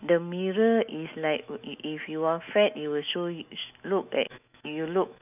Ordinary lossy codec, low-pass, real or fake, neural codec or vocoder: none; 3.6 kHz; fake; autoencoder, 48 kHz, 128 numbers a frame, DAC-VAE, trained on Japanese speech